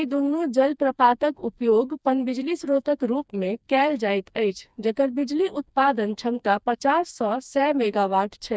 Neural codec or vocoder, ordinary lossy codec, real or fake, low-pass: codec, 16 kHz, 2 kbps, FreqCodec, smaller model; none; fake; none